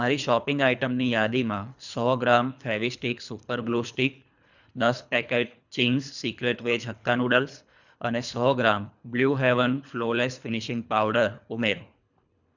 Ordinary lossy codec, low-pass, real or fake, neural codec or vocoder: none; 7.2 kHz; fake; codec, 24 kHz, 3 kbps, HILCodec